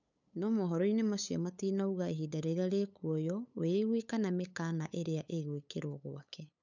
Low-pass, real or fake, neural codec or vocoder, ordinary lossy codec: 7.2 kHz; fake; codec, 16 kHz, 8 kbps, FunCodec, trained on LibriTTS, 25 frames a second; none